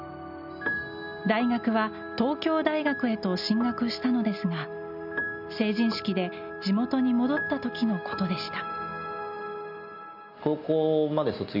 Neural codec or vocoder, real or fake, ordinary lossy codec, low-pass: none; real; none; 5.4 kHz